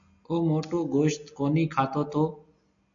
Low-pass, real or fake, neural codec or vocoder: 7.2 kHz; real; none